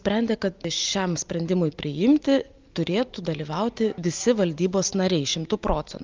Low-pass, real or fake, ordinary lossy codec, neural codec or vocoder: 7.2 kHz; real; Opus, 24 kbps; none